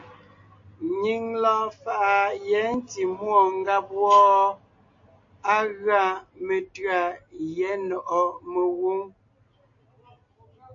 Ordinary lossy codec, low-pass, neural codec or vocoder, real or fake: AAC, 48 kbps; 7.2 kHz; none; real